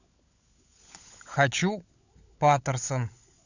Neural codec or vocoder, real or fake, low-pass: codec, 16 kHz, 16 kbps, FunCodec, trained on LibriTTS, 50 frames a second; fake; 7.2 kHz